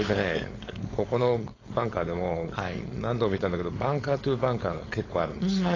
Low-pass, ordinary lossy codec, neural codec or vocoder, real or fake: 7.2 kHz; AAC, 32 kbps; codec, 16 kHz, 4.8 kbps, FACodec; fake